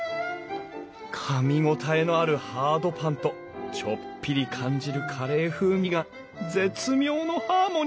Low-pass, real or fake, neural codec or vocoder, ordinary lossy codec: none; real; none; none